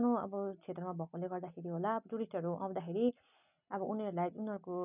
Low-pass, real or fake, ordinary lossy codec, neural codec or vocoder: 3.6 kHz; real; none; none